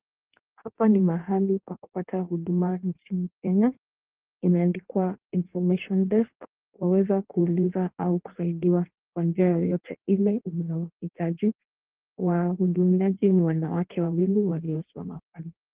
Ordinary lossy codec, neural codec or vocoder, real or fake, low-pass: Opus, 16 kbps; codec, 16 kHz in and 24 kHz out, 1.1 kbps, FireRedTTS-2 codec; fake; 3.6 kHz